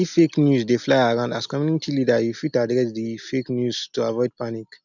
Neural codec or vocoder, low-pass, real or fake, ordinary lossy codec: none; 7.2 kHz; real; none